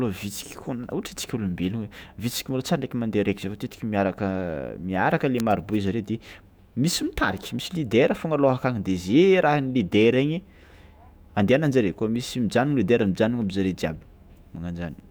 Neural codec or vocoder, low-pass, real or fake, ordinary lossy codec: autoencoder, 48 kHz, 128 numbers a frame, DAC-VAE, trained on Japanese speech; none; fake; none